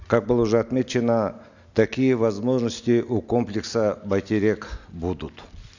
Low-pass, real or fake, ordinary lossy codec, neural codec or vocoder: 7.2 kHz; real; none; none